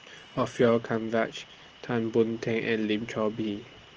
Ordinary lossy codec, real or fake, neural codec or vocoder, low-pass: Opus, 16 kbps; real; none; 7.2 kHz